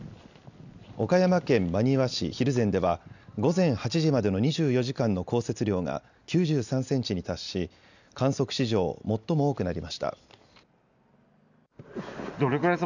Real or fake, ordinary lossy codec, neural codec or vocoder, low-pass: real; none; none; 7.2 kHz